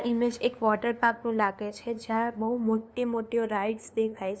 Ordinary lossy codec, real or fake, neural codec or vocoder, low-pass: none; fake; codec, 16 kHz, 2 kbps, FunCodec, trained on LibriTTS, 25 frames a second; none